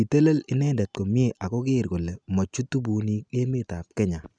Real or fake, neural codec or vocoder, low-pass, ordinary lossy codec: real; none; 9.9 kHz; none